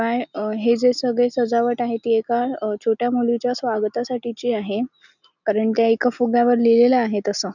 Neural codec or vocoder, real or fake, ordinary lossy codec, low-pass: none; real; none; 7.2 kHz